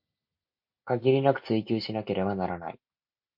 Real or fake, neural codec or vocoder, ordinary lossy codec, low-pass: real; none; MP3, 32 kbps; 5.4 kHz